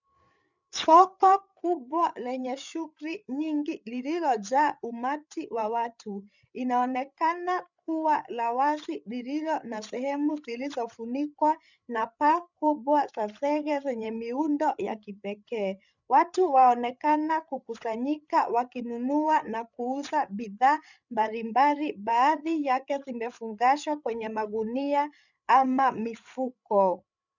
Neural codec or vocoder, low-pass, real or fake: codec, 16 kHz, 8 kbps, FreqCodec, larger model; 7.2 kHz; fake